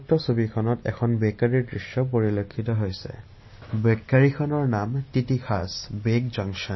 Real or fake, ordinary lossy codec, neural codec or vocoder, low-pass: real; MP3, 24 kbps; none; 7.2 kHz